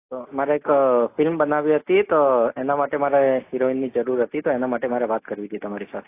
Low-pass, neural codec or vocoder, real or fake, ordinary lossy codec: 3.6 kHz; none; real; AAC, 24 kbps